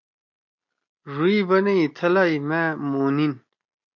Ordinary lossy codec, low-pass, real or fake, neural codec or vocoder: MP3, 48 kbps; 7.2 kHz; real; none